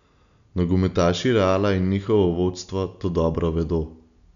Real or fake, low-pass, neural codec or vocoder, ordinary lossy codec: real; 7.2 kHz; none; none